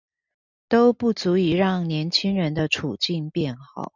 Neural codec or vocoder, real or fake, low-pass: none; real; 7.2 kHz